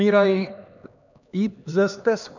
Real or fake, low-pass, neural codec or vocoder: fake; 7.2 kHz; codec, 16 kHz, 2 kbps, X-Codec, HuBERT features, trained on LibriSpeech